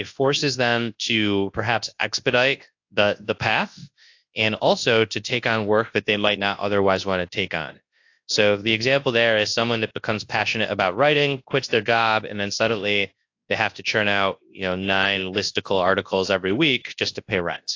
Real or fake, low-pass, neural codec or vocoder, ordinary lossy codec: fake; 7.2 kHz; codec, 24 kHz, 0.9 kbps, WavTokenizer, large speech release; AAC, 48 kbps